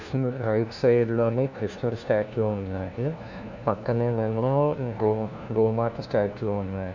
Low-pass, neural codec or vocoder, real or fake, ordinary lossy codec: 7.2 kHz; codec, 16 kHz, 1 kbps, FunCodec, trained on LibriTTS, 50 frames a second; fake; none